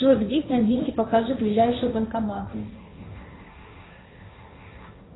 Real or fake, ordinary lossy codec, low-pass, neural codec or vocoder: fake; AAC, 16 kbps; 7.2 kHz; codec, 16 kHz, 1.1 kbps, Voila-Tokenizer